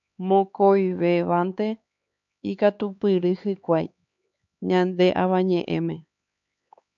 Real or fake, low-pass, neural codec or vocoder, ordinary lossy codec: fake; 7.2 kHz; codec, 16 kHz, 4 kbps, X-Codec, HuBERT features, trained on LibriSpeech; AAC, 64 kbps